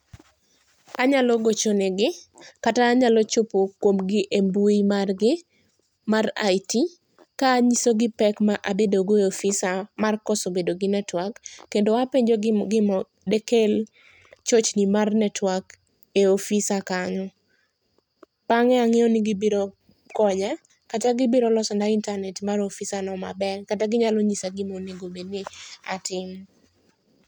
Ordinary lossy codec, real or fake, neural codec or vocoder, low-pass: none; real; none; 19.8 kHz